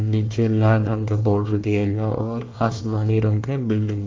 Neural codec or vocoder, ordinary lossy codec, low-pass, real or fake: codec, 24 kHz, 1 kbps, SNAC; Opus, 32 kbps; 7.2 kHz; fake